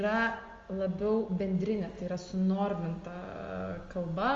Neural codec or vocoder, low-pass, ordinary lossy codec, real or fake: none; 7.2 kHz; Opus, 32 kbps; real